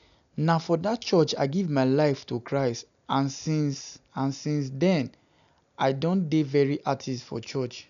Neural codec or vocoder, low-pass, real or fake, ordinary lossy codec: none; 7.2 kHz; real; none